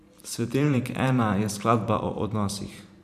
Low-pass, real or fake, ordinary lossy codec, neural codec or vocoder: 14.4 kHz; fake; none; vocoder, 44.1 kHz, 128 mel bands every 512 samples, BigVGAN v2